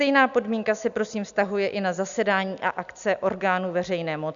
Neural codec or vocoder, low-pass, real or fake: none; 7.2 kHz; real